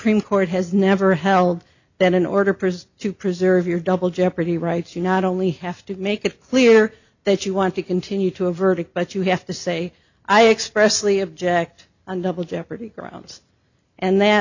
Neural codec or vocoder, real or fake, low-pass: none; real; 7.2 kHz